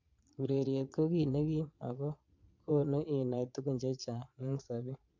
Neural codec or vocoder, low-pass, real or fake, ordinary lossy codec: vocoder, 22.05 kHz, 80 mel bands, Vocos; 7.2 kHz; fake; MP3, 64 kbps